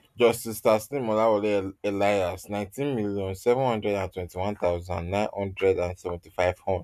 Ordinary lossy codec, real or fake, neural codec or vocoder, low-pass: none; real; none; 14.4 kHz